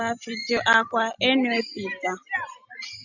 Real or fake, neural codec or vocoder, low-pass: real; none; 7.2 kHz